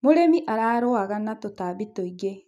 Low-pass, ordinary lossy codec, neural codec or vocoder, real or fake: 14.4 kHz; none; none; real